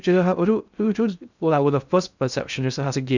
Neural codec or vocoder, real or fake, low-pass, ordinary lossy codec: codec, 16 kHz in and 24 kHz out, 0.6 kbps, FocalCodec, streaming, 2048 codes; fake; 7.2 kHz; none